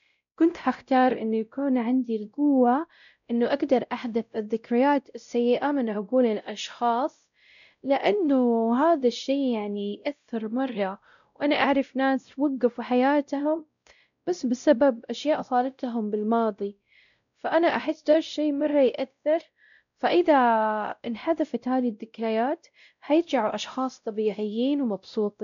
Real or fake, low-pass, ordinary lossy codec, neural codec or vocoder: fake; 7.2 kHz; none; codec, 16 kHz, 0.5 kbps, X-Codec, WavLM features, trained on Multilingual LibriSpeech